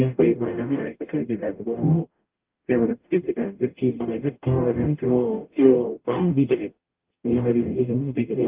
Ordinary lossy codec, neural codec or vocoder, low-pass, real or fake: Opus, 24 kbps; codec, 44.1 kHz, 0.9 kbps, DAC; 3.6 kHz; fake